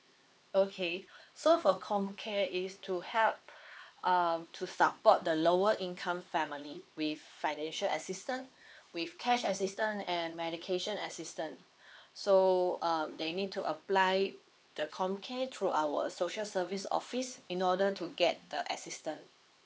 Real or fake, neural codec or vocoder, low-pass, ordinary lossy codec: fake; codec, 16 kHz, 4 kbps, X-Codec, HuBERT features, trained on LibriSpeech; none; none